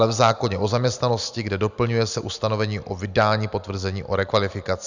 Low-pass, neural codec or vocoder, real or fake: 7.2 kHz; none; real